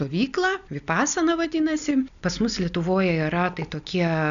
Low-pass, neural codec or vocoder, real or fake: 7.2 kHz; none; real